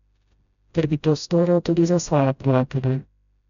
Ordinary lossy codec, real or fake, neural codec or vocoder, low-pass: none; fake; codec, 16 kHz, 0.5 kbps, FreqCodec, smaller model; 7.2 kHz